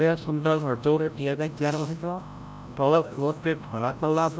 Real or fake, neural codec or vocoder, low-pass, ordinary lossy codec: fake; codec, 16 kHz, 0.5 kbps, FreqCodec, larger model; none; none